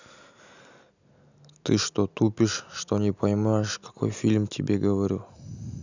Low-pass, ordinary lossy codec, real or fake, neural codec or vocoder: 7.2 kHz; none; real; none